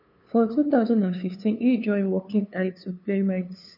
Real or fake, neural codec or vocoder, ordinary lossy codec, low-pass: fake; codec, 16 kHz, 2 kbps, FunCodec, trained on LibriTTS, 25 frames a second; none; 5.4 kHz